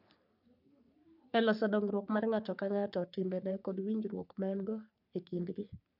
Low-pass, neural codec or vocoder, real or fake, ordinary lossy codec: 5.4 kHz; codec, 44.1 kHz, 3.4 kbps, Pupu-Codec; fake; none